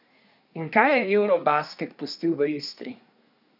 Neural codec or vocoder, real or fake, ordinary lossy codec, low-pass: codec, 24 kHz, 1 kbps, SNAC; fake; none; 5.4 kHz